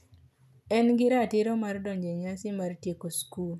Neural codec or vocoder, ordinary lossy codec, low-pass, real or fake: none; none; 14.4 kHz; real